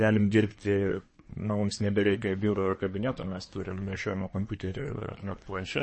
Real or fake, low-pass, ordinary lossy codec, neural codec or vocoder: fake; 10.8 kHz; MP3, 32 kbps; codec, 24 kHz, 1 kbps, SNAC